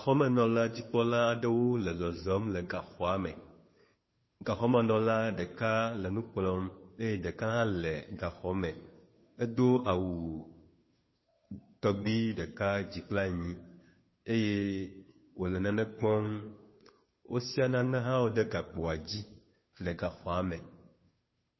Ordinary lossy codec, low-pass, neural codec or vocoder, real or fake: MP3, 24 kbps; 7.2 kHz; codec, 16 kHz, 2 kbps, FunCodec, trained on Chinese and English, 25 frames a second; fake